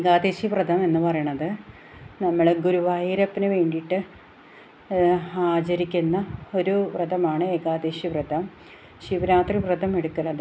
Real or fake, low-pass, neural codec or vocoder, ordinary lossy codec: real; none; none; none